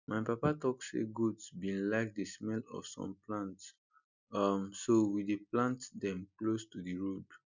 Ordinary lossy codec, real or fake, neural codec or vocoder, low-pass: none; real; none; 7.2 kHz